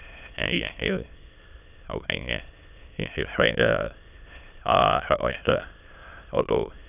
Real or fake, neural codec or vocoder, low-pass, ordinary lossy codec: fake; autoencoder, 22.05 kHz, a latent of 192 numbers a frame, VITS, trained on many speakers; 3.6 kHz; none